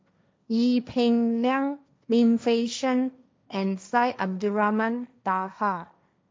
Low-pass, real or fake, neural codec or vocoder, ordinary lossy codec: none; fake; codec, 16 kHz, 1.1 kbps, Voila-Tokenizer; none